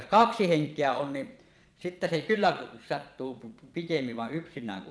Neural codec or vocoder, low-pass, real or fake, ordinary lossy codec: vocoder, 22.05 kHz, 80 mel bands, Vocos; none; fake; none